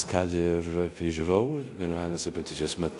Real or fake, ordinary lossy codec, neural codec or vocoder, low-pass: fake; AAC, 64 kbps; codec, 24 kHz, 0.5 kbps, DualCodec; 10.8 kHz